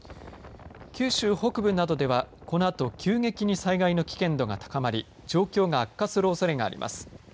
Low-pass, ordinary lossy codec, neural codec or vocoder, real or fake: none; none; none; real